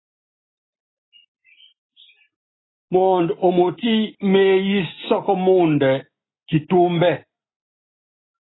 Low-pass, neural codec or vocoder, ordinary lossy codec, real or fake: 7.2 kHz; none; AAC, 16 kbps; real